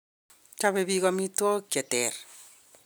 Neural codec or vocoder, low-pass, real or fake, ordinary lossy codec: none; none; real; none